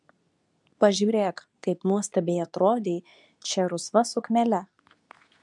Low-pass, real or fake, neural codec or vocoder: 10.8 kHz; fake; codec, 24 kHz, 0.9 kbps, WavTokenizer, medium speech release version 2